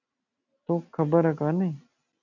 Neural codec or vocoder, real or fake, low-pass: none; real; 7.2 kHz